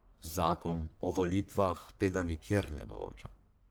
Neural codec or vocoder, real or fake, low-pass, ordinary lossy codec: codec, 44.1 kHz, 1.7 kbps, Pupu-Codec; fake; none; none